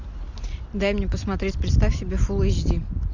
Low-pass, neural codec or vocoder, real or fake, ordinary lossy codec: 7.2 kHz; none; real; Opus, 64 kbps